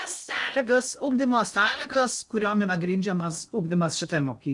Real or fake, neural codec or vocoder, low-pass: fake; codec, 16 kHz in and 24 kHz out, 0.6 kbps, FocalCodec, streaming, 4096 codes; 10.8 kHz